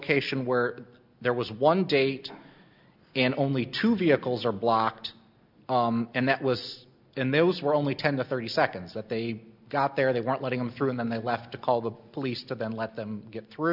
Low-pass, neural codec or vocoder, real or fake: 5.4 kHz; none; real